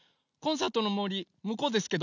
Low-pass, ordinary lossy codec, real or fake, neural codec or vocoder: 7.2 kHz; none; real; none